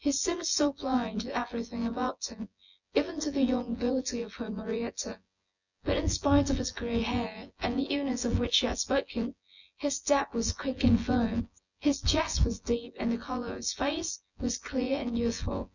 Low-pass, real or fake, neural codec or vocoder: 7.2 kHz; fake; vocoder, 24 kHz, 100 mel bands, Vocos